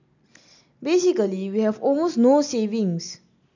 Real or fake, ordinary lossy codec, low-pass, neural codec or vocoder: real; none; 7.2 kHz; none